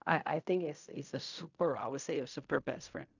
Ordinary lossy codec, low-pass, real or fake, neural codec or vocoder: none; 7.2 kHz; fake; codec, 16 kHz in and 24 kHz out, 0.4 kbps, LongCat-Audio-Codec, fine tuned four codebook decoder